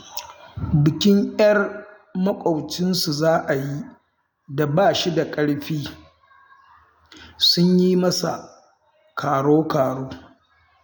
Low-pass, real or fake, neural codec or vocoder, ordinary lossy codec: none; real; none; none